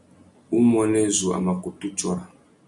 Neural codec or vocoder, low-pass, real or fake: none; 10.8 kHz; real